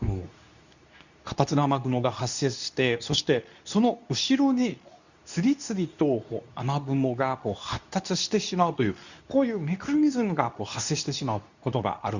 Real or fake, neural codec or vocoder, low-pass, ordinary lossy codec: fake; codec, 24 kHz, 0.9 kbps, WavTokenizer, medium speech release version 2; 7.2 kHz; none